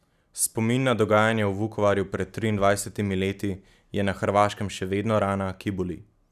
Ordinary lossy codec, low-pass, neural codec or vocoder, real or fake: none; 14.4 kHz; none; real